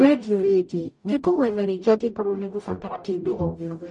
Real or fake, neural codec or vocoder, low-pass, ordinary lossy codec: fake; codec, 44.1 kHz, 0.9 kbps, DAC; 10.8 kHz; MP3, 48 kbps